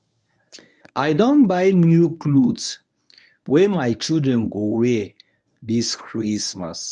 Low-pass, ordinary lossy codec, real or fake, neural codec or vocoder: 10.8 kHz; none; fake; codec, 24 kHz, 0.9 kbps, WavTokenizer, medium speech release version 1